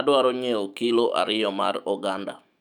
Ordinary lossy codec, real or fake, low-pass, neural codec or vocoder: none; fake; 19.8 kHz; autoencoder, 48 kHz, 128 numbers a frame, DAC-VAE, trained on Japanese speech